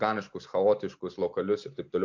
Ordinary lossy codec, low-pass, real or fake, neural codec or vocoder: MP3, 48 kbps; 7.2 kHz; real; none